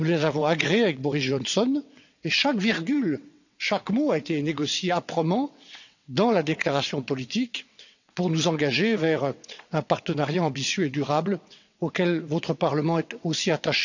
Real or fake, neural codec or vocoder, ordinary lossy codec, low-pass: fake; vocoder, 22.05 kHz, 80 mel bands, WaveNeXt; none; 7.2 kHz